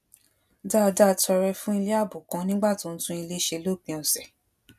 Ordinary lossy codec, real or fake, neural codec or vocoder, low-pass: none; real; none; 14.4 kHz